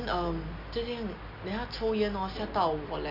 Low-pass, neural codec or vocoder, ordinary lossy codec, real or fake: 5.4 kHz; none; none; real